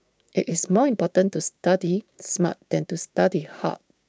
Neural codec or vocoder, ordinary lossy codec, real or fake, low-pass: codec, 16 kHz, 6 kbps, DAC; none; fake; none